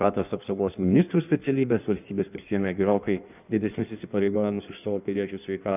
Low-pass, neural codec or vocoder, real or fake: 3.6 kHz; codec, 16 kHz in and 24 kHz out, 1.1 kbps, FireRedTTS-2 codec; fake